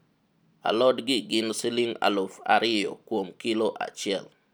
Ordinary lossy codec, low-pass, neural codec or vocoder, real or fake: none; none; none; real